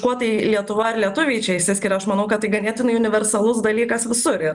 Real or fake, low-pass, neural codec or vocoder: real; 10.8 kHz; none